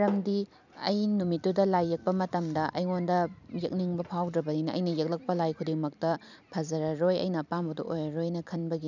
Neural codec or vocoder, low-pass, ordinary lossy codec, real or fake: vocoder, 44.1 kHz, 128 mel bands every 256 samples, BigVGAN v2; 7.2 kHz; none; fake